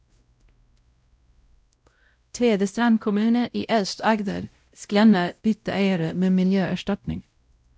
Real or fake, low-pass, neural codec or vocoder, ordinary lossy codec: fake; none; codec, 16 kHz, 0.5 kbps, X-Codec, WavLM features, trained on Multilingual LibriSpeech; none